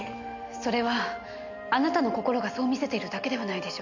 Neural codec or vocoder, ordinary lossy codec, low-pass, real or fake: none; none; 7.2 kHz; real